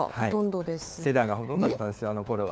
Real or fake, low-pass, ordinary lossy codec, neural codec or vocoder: fake; none; none; codec, 16 kHz, 4 kbps, FunCodec, trained on LibriTTS, 50 frames a second